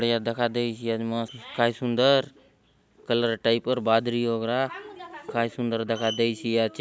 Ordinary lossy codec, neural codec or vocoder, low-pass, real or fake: none; none; none; real